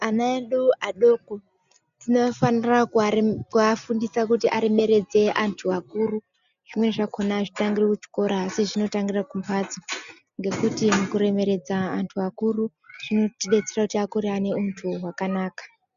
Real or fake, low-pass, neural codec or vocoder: real; 7.2 kHz; none